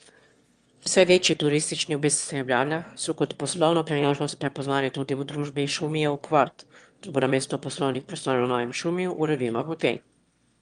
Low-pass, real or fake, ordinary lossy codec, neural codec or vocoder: 9.9 kHz; fake; Opus, 32 kbps; autoencoder, 22.05 kHz, a latent of 192 numbers a frame, VITS, trained on one speaker